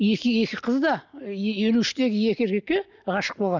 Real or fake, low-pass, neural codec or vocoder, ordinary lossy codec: real; 7.2 kHz; none; none